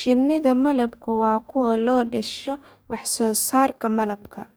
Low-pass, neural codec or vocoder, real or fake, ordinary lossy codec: none; codec, 44.1 kHz, 2.6 kbps, DAC; fake; none